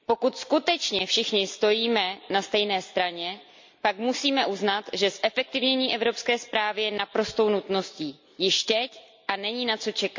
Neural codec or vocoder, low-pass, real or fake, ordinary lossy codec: none; 7.2 kHz; real; MP3, 64 kbps